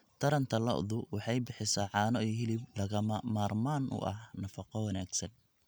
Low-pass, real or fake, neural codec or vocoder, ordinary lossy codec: none; real; none; none